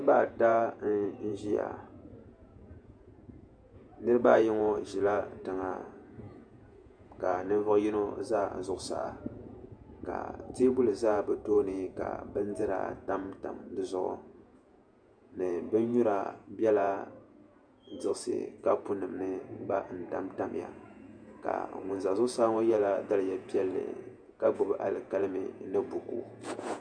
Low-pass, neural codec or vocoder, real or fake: 9.9 kHz; vocoder, 48 kHz, 128 mel bands, Vocos; fake